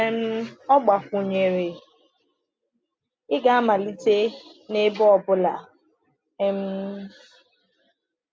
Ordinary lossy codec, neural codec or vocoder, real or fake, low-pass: none; none; real; none